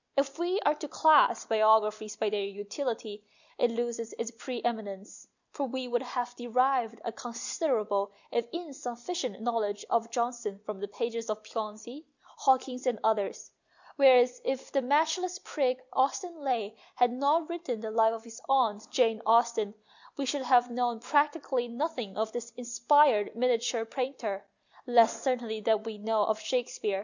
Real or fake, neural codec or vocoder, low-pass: real; none; 7.2 kHz